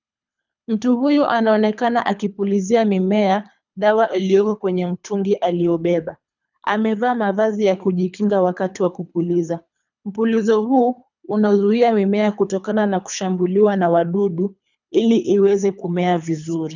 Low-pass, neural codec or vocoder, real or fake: 7.2 kHz; codec, 24 kHz, 3 kbps, HILCodec; fake